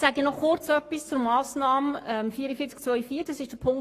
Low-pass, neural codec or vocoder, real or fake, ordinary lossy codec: 14.4 kHz; codec, 44.1 kHz, 7.8 kbps, Pupu-Codec; fake; AAC, 48 kbps